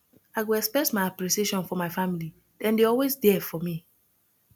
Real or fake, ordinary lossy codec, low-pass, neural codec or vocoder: real; none; none; none